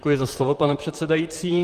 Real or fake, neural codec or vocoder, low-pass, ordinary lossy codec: fake; vocoder, 44.1 kHz, 128 mel bands, Pupu-Vocoder; 14.4 kHz; Opus, 32 kbps